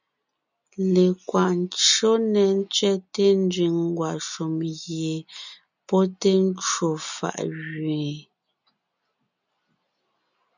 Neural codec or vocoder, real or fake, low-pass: none; real; 7.2 kHz